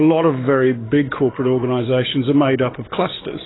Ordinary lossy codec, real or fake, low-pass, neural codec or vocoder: AAC, 16 kbps; fake; 7.2 kHz; codec, 44.1 kHz, 7.8 kbps, DAC